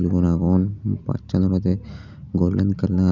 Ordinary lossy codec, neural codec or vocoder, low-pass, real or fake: none; none; 7.2 kHz; real